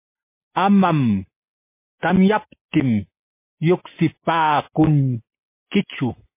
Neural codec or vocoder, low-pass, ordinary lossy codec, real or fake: none; 3.6 kHz; MP3, 24 kbps; real